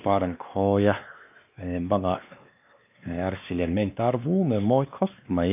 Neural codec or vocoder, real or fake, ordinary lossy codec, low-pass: codec, 16 kHz, 2 kbps, X-Codec, WavLM features, trained on Multilingual LibriSpeech; fake; none; 3.6 kHz